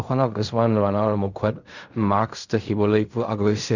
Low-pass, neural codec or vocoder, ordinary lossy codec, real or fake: 7.2 kHz; codec, 16 kHz in and 24 kHz out, 0.4 kbps, LongCat-Audio-Codec, fine tuned four codebook decoder; none; fake